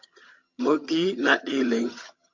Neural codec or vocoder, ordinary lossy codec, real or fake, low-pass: vocoder, 22.05 kHz, 80 mel bands, HiFi-GAN; MP3, 48 kbps; fake; 7.2 kHz